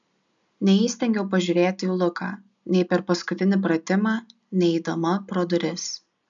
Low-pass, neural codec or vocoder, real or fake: 7.2 kHz; none; real